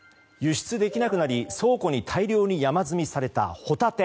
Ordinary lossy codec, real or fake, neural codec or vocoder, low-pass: none; real; none; none